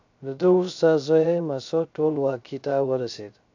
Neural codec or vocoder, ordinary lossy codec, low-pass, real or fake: codec, 16 kHz, 0.3 kbps, FocalCodec; AAC, 48 kbps; 7.2 kHz; fake